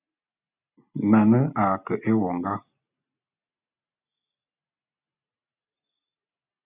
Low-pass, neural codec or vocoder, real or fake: 3.6 kHz; none; real